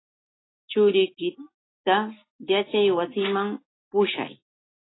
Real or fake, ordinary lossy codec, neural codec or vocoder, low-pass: real; AAC, 16 kbps; none; 7.2 kHz